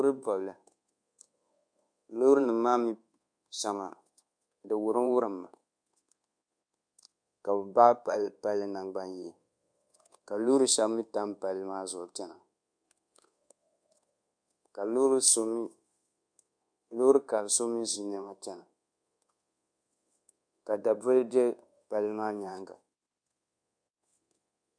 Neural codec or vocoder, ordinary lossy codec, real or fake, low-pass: codec, 24 kHz, 1.2 kbps, DualCodec; MP3, 64 kbps; fake; 9.9 kHz